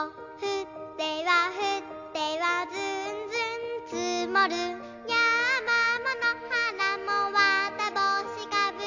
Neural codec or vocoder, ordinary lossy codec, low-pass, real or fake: none; none; 7.2 kHz; real